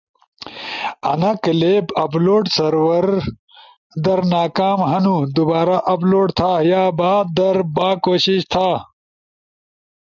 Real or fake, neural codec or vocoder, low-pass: real; none; 7.2 kHz